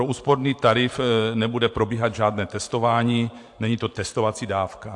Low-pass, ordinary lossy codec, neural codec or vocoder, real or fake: 10.8 kHz; AAC, 64 kbps; vocoder, 44.1 kHz, 128 mel bands every 512 samples, BigVGAN v2; fake